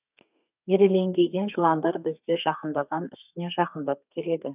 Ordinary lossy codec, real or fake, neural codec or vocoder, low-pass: none; fake; codec, 32 kHz, 1.9 kbps, SNAC; 3.6 kHz